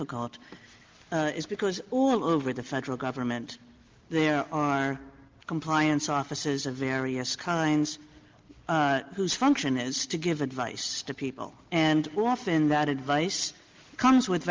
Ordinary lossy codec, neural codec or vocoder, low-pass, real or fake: Opus, 24 kbps; none; 7.2 kHz; real